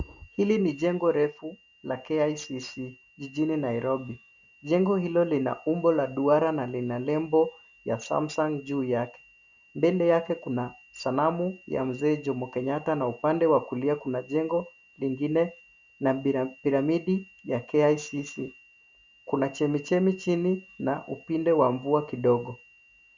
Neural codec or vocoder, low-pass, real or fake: none; 7.2 kHz; real